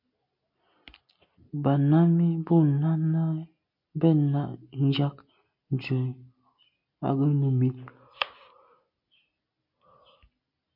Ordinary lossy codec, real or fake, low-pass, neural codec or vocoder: MP3, 32 kbps; real; 5.4 kHz; none